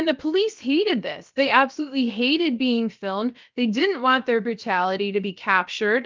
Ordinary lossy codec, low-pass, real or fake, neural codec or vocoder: Opus, 24 kbps; 7.2 kHz; fake; codec, 16 kHz, about 1 kbps, DyCAST, with the encoder's durations